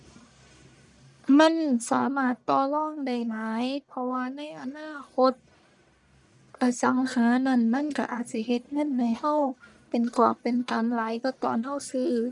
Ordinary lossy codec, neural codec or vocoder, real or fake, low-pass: none; codec, 44.1 kHz, 1.7 kbps, Pupu-Codec; fake; 10.8 kHz